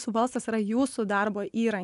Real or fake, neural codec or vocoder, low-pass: real; none; 10.8 kHz